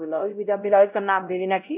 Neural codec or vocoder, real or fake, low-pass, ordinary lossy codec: codec, 16 kHz, 0.5 kbps, X-Codec, WavLM features, trained on Multilingual LibriSpeech; fake; 3.6 kHz; none